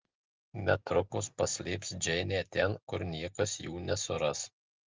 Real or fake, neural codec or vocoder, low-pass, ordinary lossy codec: real; none; 7.2 kHz; Opus, 32 kbps